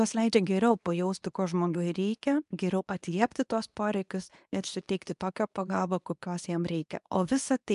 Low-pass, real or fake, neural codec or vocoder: 10.8 kHz; fake; codec, 24 kHz, 0.9 kbps, WavTokenizer, medium speech release version 2